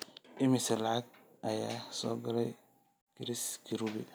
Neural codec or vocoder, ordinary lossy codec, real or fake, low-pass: none; none; real; none